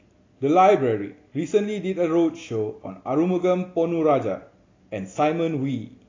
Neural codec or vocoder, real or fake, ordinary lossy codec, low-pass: none; real; AAC, 32 kbps; 7.2 kHz